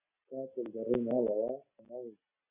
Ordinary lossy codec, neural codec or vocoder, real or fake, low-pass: AAC, 32 kbps; none; real; 3.6 kHz